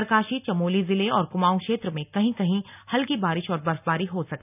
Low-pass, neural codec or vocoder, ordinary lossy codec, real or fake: 3.6 kHz; none; none; real